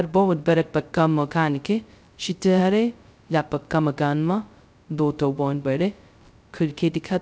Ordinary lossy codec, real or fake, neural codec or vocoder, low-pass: none; fake; codec, 16 kHz, 0.2 kbps, FocalCodec; none